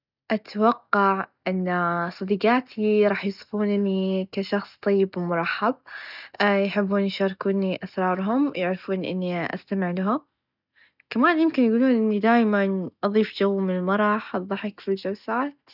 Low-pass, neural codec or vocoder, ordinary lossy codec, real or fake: 5.4 kHz; none; none; real